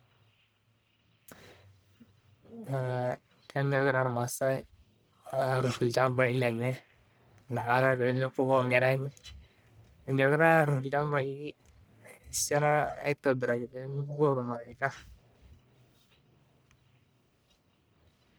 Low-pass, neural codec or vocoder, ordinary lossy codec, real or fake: none; codec, 44.1 kHz, 1.7 kbps, Pupu-Codec; none; fake